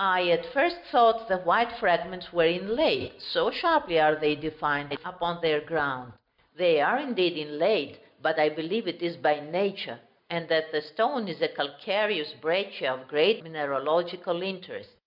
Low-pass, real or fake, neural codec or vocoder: 5.4 kHz; real; none